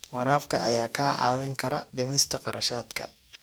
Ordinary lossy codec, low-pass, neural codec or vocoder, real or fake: none; none; codec, 44.1 kHz, 2.6 kbps, DAC; fake